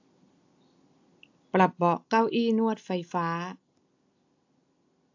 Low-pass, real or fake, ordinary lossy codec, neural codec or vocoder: 7.2 kHz; real; AAC, 48 kbps; none